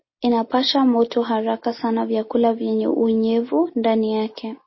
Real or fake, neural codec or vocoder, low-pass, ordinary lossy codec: real; none; 7.2 kHz; MP3, 24 kbps